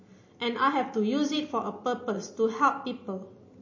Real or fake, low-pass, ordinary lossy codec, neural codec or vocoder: real; 7.2 kHz; MP3, 32 kbps; none